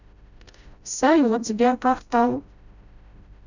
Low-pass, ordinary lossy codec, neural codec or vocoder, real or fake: 7.2 kHz; none; codec, 16 kHz, 0.5 kbps, FreqCodec, smaller model; fake